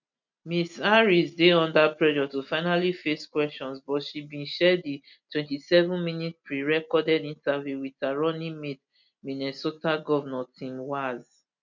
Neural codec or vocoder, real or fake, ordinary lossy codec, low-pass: none; real; none; 7.2 kHz